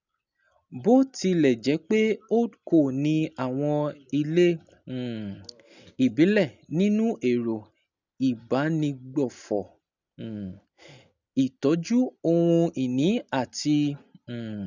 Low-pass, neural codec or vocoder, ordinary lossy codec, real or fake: 7.2 kHz; none; none; real